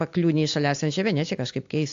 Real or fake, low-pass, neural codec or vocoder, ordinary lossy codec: real; 7.2 kHz; none; AAC, 64 kbps